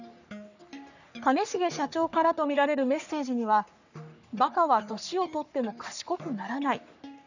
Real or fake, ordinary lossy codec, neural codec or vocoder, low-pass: fake; none; codec, 44.1 kHz, 3.4 kbps, Pupu-Codec; 7.2 kHz